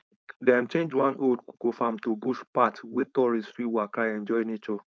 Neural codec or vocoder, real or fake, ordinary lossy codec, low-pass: codec, 16 kHz, 4.8 kbps, FACodec; fake; none; none